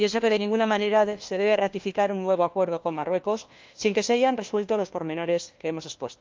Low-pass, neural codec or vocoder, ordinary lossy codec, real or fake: 7.2 kHz; codec, 16 kHz, 1 kbps, FunCodec, trained on LibriTTS, 50 frames a second; Opus, 32 kbps; fake